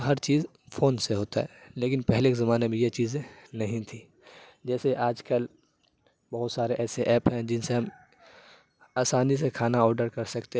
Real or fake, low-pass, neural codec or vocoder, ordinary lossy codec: real; none; none; none